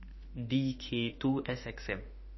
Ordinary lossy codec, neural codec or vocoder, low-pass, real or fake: MP3, 24 kbps; autoencoder, 48 kHz, 32 numbers a frame, DAC-VAE, trained on Japanese speech; 7.2 kHz; fake